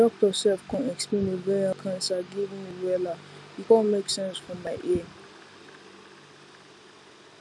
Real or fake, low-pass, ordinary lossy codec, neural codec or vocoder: real; none; none; none